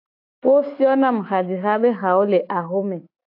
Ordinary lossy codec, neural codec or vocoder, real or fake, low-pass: AAC, 32 kbps; autoencoder, 48 kHz, 128 numbers a frame, DAC-VAE, trained on Japanese speech; fake; 5.4 kHz